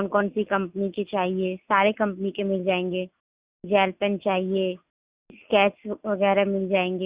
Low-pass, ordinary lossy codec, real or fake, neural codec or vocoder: 3.6 kHz; Opus, 64 kbps; real; none